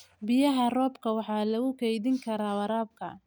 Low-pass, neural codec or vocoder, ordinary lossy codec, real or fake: none; none; none; real